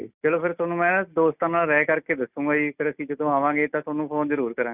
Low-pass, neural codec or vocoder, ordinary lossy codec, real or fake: 3.6 kHz; none; none; real